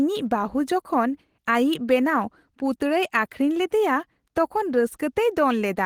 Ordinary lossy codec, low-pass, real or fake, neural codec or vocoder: Opus, 16 kbps; 19.8 kHz; real; none